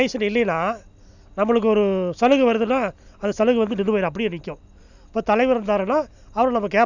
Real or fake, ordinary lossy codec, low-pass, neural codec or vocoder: real; none; 7.2 kHz; none